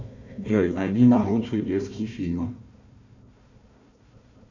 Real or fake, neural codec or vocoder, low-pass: fake; codec, 16 kHz, 1 kbps, FunCodec, trained on Chinese and English, 50 frames a second; 7.2 kHz